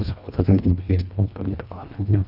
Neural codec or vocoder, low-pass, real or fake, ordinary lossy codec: codec, 24 kHz, 1.5 kbps, HILCodec; 5.4 kHz; fake; none